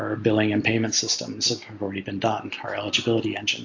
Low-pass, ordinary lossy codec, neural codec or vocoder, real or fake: 7.2 kHz; AAC, 48 kbps; none; real